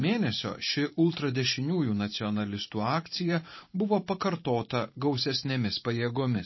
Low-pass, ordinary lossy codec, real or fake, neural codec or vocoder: 7.2 kHz; MP3, 24 kbps; fake; vocoder, 44.1 kHz, 128 mel bands every 512 samples, BigVGAN v2